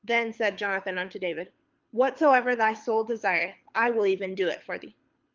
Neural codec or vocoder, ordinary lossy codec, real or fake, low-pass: codec, 24 kHz, 6 kbps, HILCodec; Opus, 24 kbps; fake; 7.2 kHz